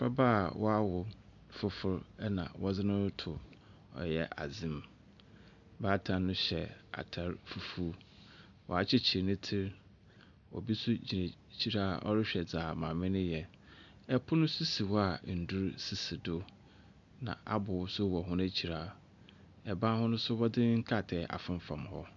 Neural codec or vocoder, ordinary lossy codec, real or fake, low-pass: none; MP3, 96 kbps; real; 7.2 kHz